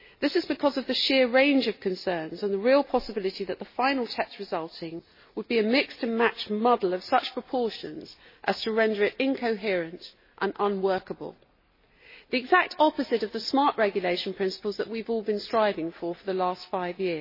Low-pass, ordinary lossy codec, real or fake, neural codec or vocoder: 5.4 kHz; MP3, 24 kbps; real; none